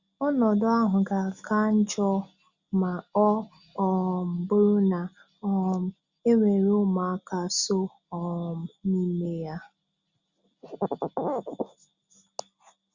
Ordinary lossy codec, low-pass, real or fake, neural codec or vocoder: Opus, 64 kbps; 7.2 kHz; real; none